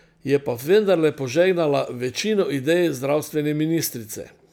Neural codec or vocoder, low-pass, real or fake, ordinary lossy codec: none; none; real; none